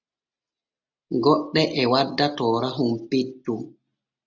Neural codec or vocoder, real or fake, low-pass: none; real; 7.2 kHz